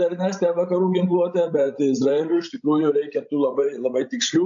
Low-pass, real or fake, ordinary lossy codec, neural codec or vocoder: 7.2 kHz; fake; AAC, 64 kbps; codec, 16 kHz, 16 kbps, FreqCodec, larger model